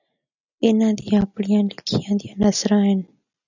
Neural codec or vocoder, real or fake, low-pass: none; real; 7.2 kHz